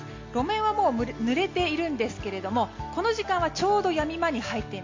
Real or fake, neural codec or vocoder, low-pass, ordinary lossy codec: real; none; 7.2 kHz; MP3, 48 kbps